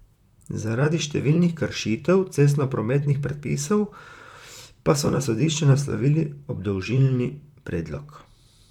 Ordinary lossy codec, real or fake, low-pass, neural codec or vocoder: none; fake; 19.8 kHz; vocoder, 44.1 kHz, 128 mel bands, Pupu-Vocoder